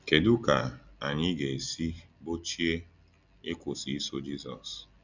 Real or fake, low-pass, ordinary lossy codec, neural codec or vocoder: real; 7.2 kHz; none; none